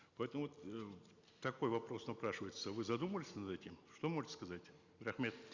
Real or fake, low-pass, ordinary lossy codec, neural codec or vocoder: real; 7.2 kHz; Opus, 64 kbps; none